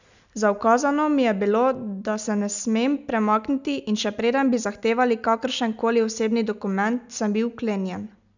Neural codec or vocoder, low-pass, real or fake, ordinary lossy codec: none; 7.2 kHz; real; none